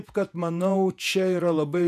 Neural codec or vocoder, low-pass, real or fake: vocoder, 48 kHz, 128 mel bands, Vocos; 14.4 kHz; fake